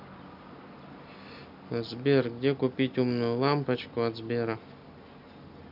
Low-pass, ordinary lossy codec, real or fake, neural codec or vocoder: 5.4 kHz; none; real; none